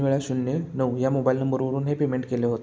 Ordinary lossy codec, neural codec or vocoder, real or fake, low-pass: none; none; real; none